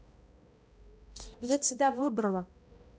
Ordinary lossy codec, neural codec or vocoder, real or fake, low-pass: none; codec, 16 kHz, 0.5 kbps, X-Codec, HuBERT features, trained on balanced general audio; fake; none